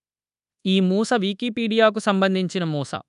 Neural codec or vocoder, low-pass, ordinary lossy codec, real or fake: codec, 24 kHz, 1.2 kbps, DualCodec; 10.8 kHz; none; fake